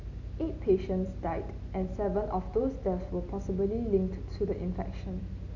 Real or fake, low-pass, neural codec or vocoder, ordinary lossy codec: real; 7.2 kHz; none; none